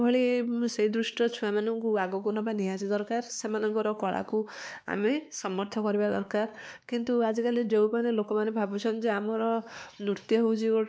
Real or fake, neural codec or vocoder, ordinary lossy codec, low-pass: fake; codec, 16 kHz, 2 kbps, X-Codec, WavLM features, trained on Multilingual LibriSpeech; none; none